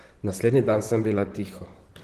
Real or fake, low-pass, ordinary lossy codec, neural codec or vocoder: fake; 14.4 kHz; Opus, 16 kbps; vocoder, 44.1 kHz, 128 mel bands, Pupu-Vocoder